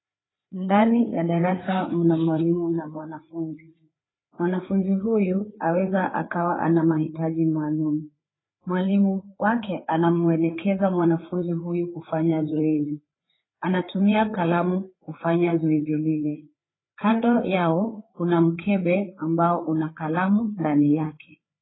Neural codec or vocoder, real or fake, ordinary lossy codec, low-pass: codec, 16 kHz, 4 kbps, FreqCodec, larger model; fake; AAC, 16 kbps; 7.2 kHz